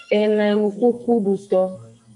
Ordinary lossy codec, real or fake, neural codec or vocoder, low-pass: AAC, 64 kbps; fake; codec, 44.1 kHz, 2.6 kbps, SNAC; 10.8 kHz